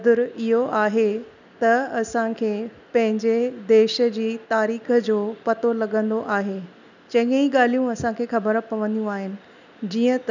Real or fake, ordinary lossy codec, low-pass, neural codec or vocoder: real; none; 7.2 kHz; none